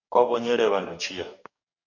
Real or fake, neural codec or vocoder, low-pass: fake; codec, 44.1 kHz, 2.6 kbps, DAC; 7.2 kHz